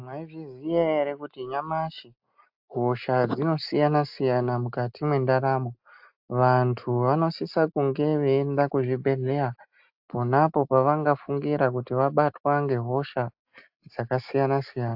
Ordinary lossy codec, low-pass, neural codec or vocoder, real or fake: AAC, 48 kbps; 5.4 kHz; none; real